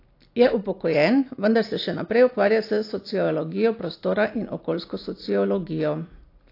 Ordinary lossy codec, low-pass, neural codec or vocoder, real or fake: AAC, 32 kbps; 5.4 kHz; none; real